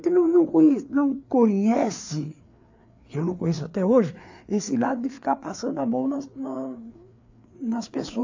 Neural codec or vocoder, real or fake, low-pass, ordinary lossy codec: codec, 16 kHz, 4 kbps, FreqCodec, larger model; fake; 7.2 kHz; AAC, 48 kbps